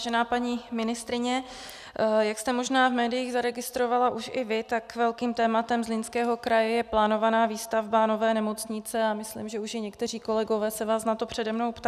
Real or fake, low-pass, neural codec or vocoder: real; 14.4 kHz; none